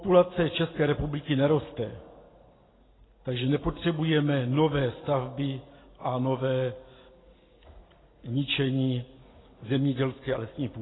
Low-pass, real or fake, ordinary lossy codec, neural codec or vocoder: 7.2 kHz; real; AAC, 16 kbps; none